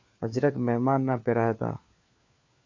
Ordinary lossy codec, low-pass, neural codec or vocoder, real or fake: MP3, 48 kbps; 7.2 kHz; codec, 16 kHz, 4 kbps, FunCodec, trained on LibriTTS, 50 frames a second; fake